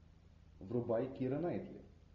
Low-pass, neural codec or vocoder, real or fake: 7.2 kHz; none; real